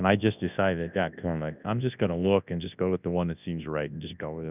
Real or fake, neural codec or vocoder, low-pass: fake; codec, 24 kHz, 0.9 kbps, WavTokenizer, large speech release; 3.6 kHz